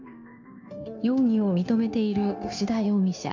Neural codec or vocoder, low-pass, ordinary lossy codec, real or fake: codec, 16 kHz, 0.9 kbps, LongCat-Audio-Codec; 7.2 kHz; AAC, 48 kbps; fake